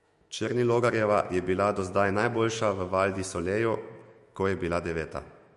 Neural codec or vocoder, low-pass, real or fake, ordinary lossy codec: autoencoder, 48 kHz, 128 numbers a frame, DAC-VAE, trained on Japanese speech; 14.4 kHz; fake; MP3, 48 kbps